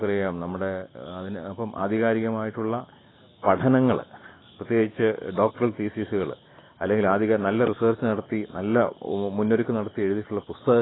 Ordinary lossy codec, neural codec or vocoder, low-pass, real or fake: AAC, 16 kbps; none; 7.2 kHz; real